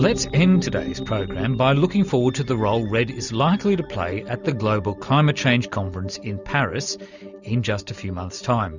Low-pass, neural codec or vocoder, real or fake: 7.2 kHz; none; real